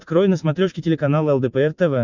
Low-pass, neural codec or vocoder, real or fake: 7.2 kHz; none; real